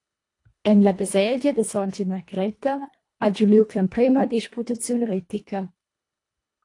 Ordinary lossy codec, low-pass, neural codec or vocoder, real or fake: AAC, 48 kbps; 10.8 kHz; codec, 24 kHz, 1.5 kbps, HILCodec; fake